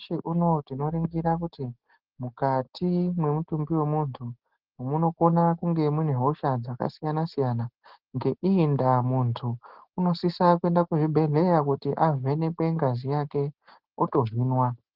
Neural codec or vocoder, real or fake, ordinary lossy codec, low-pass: none; real; Opus, 16 kbps; 5.4 kHz